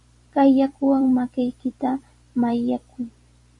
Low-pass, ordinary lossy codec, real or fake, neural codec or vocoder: 10.8 kHz; MP3, 48 kbps; fake; vocoder, 44.1 kHz, 128 mel bands every 256 samples, BigVGAN v2